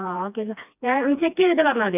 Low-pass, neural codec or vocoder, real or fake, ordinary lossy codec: 3.6 kHz; codec, 16 kHz, 2 kbps, FreqCodec, smaller model; fake; none